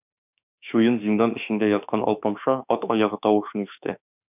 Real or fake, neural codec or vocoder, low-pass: fake; autoencoder, 48 kHz, 32 numbers a frame, DAC-VAE, trained on Japanese speech; 3.6 kHz